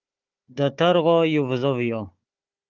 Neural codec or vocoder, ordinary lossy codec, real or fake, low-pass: codec, 16 kHz, 4 kbps, FunCodec, trained on Chinese and English, 50 frames a second; Opus, 24 kbps; fake; 7.2 kHz